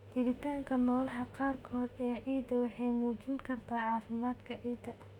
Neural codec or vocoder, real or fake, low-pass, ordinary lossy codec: autoencoder, 48 kHz, 32 numbers a frame, DAC-VAE, trained on Japanese speech; fake; 19.8 kHz; none